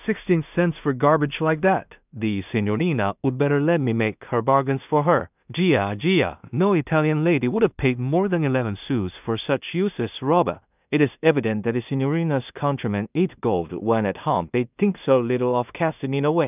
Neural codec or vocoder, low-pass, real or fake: codec, 16 kHz in and 24 kHz out, 0.4 kbps, LongCat-Audio-Codec, two codebook decoder; 3.6 kHz; fake